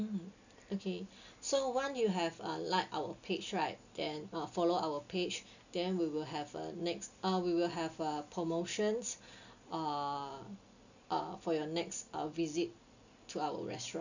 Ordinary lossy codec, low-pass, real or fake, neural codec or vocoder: none; 7.2 kHz; real; none